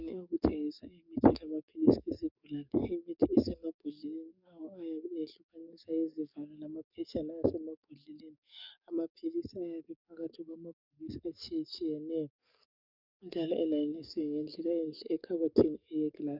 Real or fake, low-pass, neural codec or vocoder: fake; 5.4 kHz; codec, 44.1 kHz, 7.8 kbps, DAC